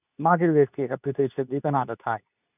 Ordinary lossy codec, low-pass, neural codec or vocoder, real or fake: none; 3.6 kHz; codec, 24 kHz, 0.9 kbps, WavTokenizer, medium speech release version 2; fake